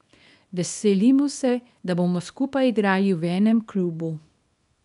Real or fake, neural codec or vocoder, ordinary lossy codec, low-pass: fake; codec, 24 kHz, 0.9 kbps, WavTokenizer, small release; none; 10.8 kHz